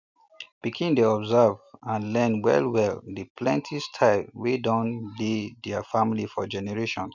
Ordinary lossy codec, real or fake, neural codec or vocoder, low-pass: none; real; none; 7.2 kHz